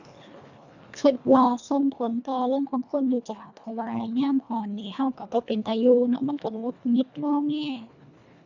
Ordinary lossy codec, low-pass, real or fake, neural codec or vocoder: none; 7.2 kHz; fake; codec, 24 kHz, 1.5 kbps, HILCodec